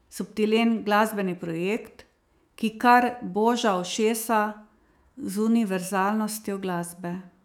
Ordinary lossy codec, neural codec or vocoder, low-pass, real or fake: none; autoencoder, 48 kHz, 128 numbers a frame, DAC-VAE, trained on Japanese speech; 19.8 kHz; fake